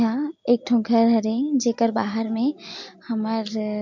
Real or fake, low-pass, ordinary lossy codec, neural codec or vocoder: real; 7.2 kHz; MP3, 48 kbps; none